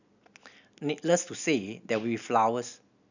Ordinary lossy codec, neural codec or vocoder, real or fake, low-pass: none; none; real; 7.2 kHz